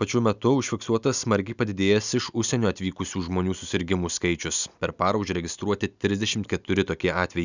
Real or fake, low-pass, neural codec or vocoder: real; 7.2 kHz; none